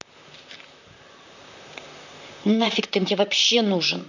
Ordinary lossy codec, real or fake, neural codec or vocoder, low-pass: none; fake; vocoder, 44.1 kHz, 128 mel bands, Pupu-Vocoder; 7.2 kHz